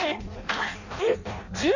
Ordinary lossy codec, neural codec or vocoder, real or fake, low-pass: none; codec, 16 kHz in and 24 kHz out, 0.6 kbps, FireRedTTS-2 codec; fake; 7.2 kHz